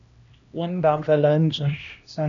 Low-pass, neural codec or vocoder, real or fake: 7.2 kHz; codec, 16 kHz, 1 kbps, X-Codec, HuBERT features, trained on LibriSpeech; fake